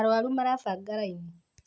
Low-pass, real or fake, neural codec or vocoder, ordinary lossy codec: none; real; none; none